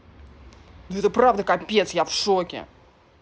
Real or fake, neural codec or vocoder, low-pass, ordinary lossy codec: real; none; none; none